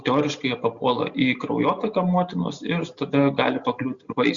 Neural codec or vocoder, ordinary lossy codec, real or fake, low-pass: none; Opus, 64 kbps; real; 7.2 kHz